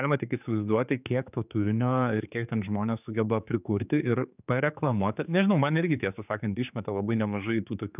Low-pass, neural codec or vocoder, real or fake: 3.6 kHz; codec, 16 kHz, 4 kbps, X-Codec, HuBERT features, trained on general audio; fake